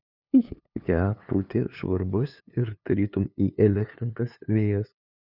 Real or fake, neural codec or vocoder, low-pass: fake; codec, 16 kHz, 2 kbps, FunCodec, trained on LibriTTS, 25 frames a second; 5.4 kHz